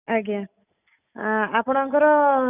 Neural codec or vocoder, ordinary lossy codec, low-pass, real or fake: none; none; 3.6 kHz; real